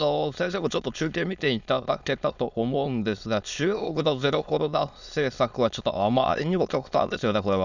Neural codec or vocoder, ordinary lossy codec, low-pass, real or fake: autoencoder, 22.05 kHz, a latent of 192 numbers a frame, VITS, trained on many speakers; none; 7.2 kHz; fake